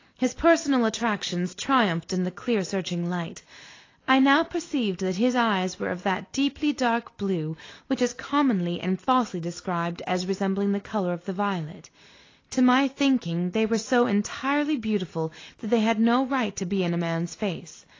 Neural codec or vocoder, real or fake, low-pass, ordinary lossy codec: none; real; 7.2 kHz; AAC, 32 kbps